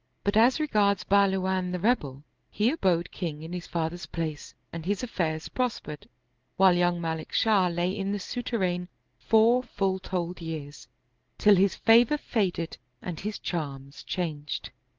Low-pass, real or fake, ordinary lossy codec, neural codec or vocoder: 7.2 kHz; real; Opus, 16 kbps; none